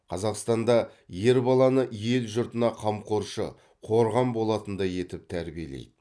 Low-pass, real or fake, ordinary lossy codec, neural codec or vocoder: none; real; none; none